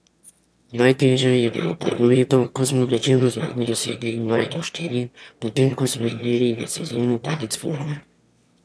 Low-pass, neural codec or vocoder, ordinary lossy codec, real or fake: none; autoencoder, 22.05 kHz, a latent of 192 numbers a frame, VITS, trained on one speaker; none; fake